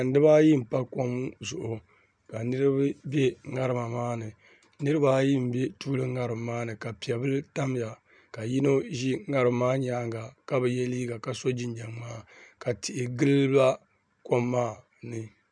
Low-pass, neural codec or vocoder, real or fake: 9.9 kHz; none; real